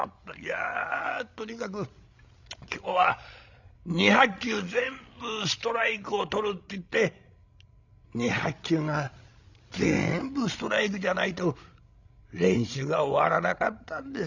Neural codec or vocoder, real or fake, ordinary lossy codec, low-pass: codec, 16 kHz, 16 kbps, FreqCodec, larger model; fake; none; 7.2 kHz